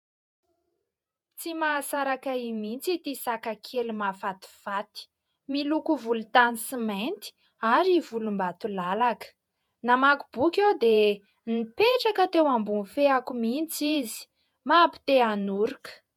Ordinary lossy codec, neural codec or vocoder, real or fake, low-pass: MP3, 96 kbps; vocoder, 48 kHz, 128 mel bands, Vocos; fake; 19.8 kHz